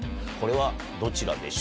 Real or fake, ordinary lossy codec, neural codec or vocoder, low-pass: real; none; none; none